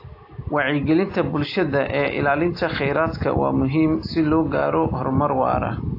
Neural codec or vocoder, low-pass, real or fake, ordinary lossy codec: none; 5.4 kHz; real; AAC, 32 kbps